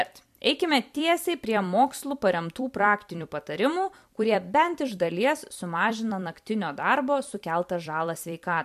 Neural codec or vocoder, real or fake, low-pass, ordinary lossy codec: vocoder, 44.1 kHz, 128 mel bands every 256 samples, BigVGAN v2; fake; 14.4 kHz; MP3, 96 kbps